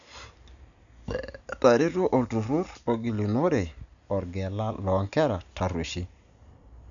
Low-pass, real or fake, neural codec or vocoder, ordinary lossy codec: 7.2 kHz; real; none; none